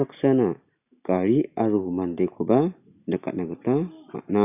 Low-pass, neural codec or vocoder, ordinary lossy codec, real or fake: 3.6 kHz; none; none; real